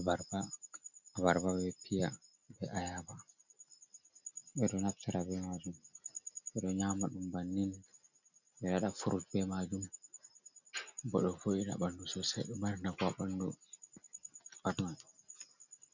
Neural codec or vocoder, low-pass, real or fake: none; 7.2 kHz; real